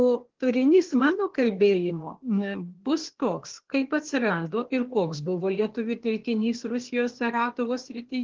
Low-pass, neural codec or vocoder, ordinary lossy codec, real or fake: 7.2 kHz; codec, 16 kHz, 0.8 kbps, ZipCodec; Opus, 16 kbps; fake